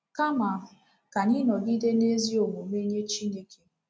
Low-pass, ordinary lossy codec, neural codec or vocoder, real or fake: none; none; none; real